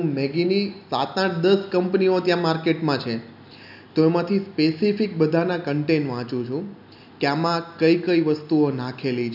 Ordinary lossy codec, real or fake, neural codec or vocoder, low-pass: none; real; none; 5.4 kHz